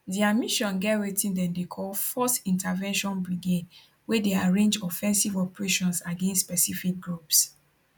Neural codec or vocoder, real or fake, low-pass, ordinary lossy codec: none; real; none; none